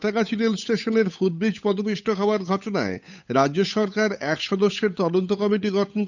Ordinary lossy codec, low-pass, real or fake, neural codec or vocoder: none; 7.2 kHz; fake; codec, 16 kHz, 8 kbps, FunCodec, trained on Chinese and English, 25 frames a second